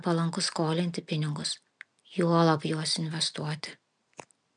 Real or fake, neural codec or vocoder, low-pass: real; none; 9.9 kHz